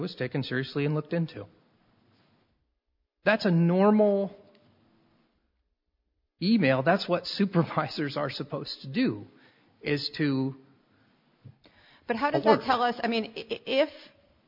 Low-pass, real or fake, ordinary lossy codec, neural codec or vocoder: 5.4 kHz; real; MP3, 48 kbps; none